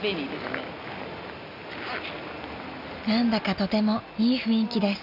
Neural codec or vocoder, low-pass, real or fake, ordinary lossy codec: none; 5.4 kHz; real; none